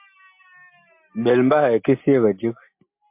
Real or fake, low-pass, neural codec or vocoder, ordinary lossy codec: real; 3.6 kHz; none; MP3, 32 kbps